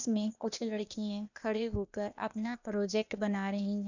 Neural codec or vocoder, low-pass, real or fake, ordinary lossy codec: codec, 16 kHz, 0.8 kbps, ZipCodec; 7.2 kHz; fake; none